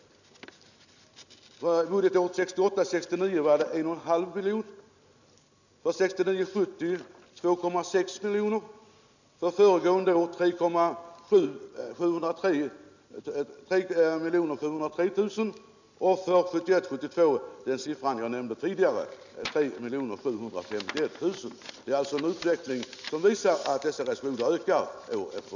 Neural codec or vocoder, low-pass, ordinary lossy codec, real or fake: none; 7.2 kHz; none; real